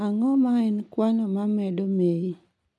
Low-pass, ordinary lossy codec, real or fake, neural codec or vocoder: none; none; real; none